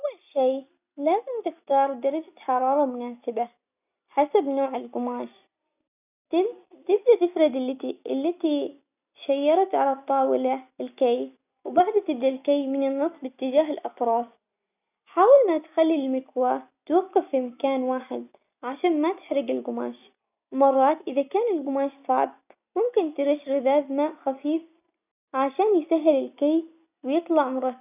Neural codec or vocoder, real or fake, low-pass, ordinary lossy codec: none; real; 3.6 kHz; none